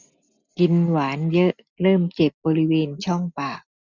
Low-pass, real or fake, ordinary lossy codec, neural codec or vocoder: 7.2 kHz; real; none; none